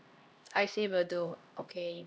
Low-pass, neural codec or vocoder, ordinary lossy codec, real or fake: none; codec, 16 kHz, 1 kbps, X-Codec, HuBERT features, trained on LibriSpeech; none; fake